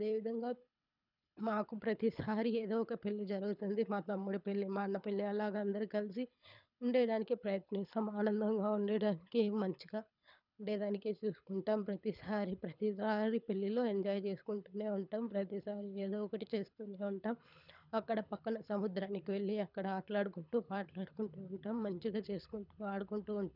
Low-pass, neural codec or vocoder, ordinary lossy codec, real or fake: 5.4 kHz; codec, 24 kHz, 6 kbps, HILCodec; none; fake